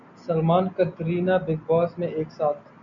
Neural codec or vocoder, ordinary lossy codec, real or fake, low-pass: none; MP3, 96 kbps; real; 7.2 kHz